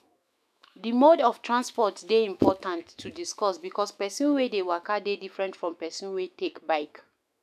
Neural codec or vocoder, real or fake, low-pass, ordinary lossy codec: autoencoder, 48 kHz, 128 numbers a frame, DAC-VAE, trained on Japanese speech; fake; 14.4 kHz; none